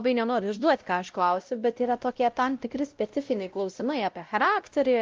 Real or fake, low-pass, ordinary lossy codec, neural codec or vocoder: fake; 7.2 kHz; Opus, 32 kbps; codec, 16 kHz, 0.5 kbps, X-Codec, WavLM features, trained on Multilingual LibriSpeech